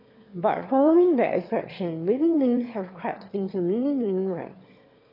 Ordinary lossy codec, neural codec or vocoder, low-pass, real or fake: AAC, 32 kbps; autoencoder, 22.05 kHz, a latent of 192 numbers a frame, VITS, trained on one speaker; 5.4 kHz; fake